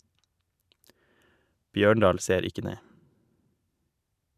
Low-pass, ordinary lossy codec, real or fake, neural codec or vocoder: 14.4 kHz; none; real; none